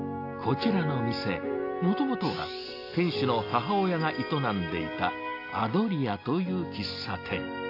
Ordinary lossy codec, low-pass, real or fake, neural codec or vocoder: AAC, 32 kbps; 5.4 kHz; real; none